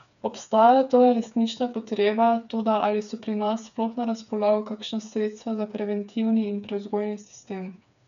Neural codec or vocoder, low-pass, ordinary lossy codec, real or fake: codec, 16 kHz, 4 kbps, FreqCodec, smaller model; 7.2 kHz; none; fake